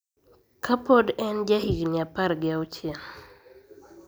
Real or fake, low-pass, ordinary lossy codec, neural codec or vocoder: real; none; none; none